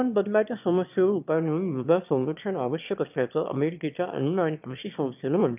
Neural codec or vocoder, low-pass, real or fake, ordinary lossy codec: autoencoder, 22.05 kHz, a latent of 192 numbers a frame, VITS, trained on one speaker; 3.6 kHz; fake; none